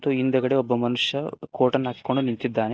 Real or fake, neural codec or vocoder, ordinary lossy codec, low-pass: real; none; none; none